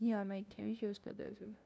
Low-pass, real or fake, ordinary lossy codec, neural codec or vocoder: none; fake; none; codec, 16 kHz, 0.5 kbps, FunCodec, trained on LibriTTS, 25 frames a second